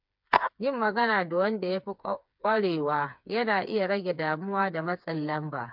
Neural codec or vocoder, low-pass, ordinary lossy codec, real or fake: codec, 16 kHz, 4 kbps, FreqCodec, smaller model; 5.4 kHz; MP3, 48 kbps; fake